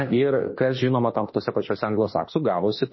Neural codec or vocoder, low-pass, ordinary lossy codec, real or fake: autoencoder, 48 kHz, 32 numbers a frame, DAC-VAE, trained on Japanese speech; 7.2 kHz; MP3, 24 kbps; fake